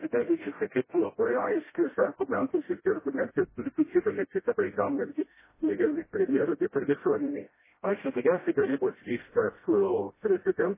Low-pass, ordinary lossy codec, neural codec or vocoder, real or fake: 3.6 kHz; MP3, 16 kbps; codec, 16 kHz, 0.5 kbps, FreqCodec, smaller model; fake